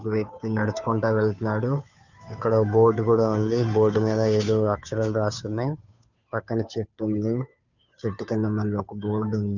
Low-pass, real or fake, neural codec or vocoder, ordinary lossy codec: 7.2 kHz; fake; codec, 16 kHz, 2 kbps, FunCodec, trained on Chinese and English, 25 frames a second; none